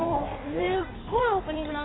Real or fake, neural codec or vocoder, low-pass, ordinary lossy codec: fake; codec, 16 kHz in and 24 kHz out, 1.1 kbps, FireRedTTS-2 codec; 7.2 kHz; AAC, 16 kbps